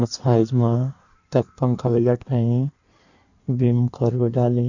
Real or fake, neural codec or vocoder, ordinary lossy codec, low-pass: fake; codec, 16 kHz in and 24 kHz out, 1.1 kbps, FireRedTTS-2 codec; none; 7.2 kHz